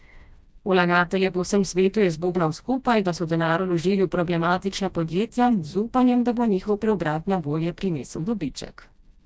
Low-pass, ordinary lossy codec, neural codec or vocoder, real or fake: none; none; codec, 16 kHz, 1 kbps, FreqCodec, smaller model; fake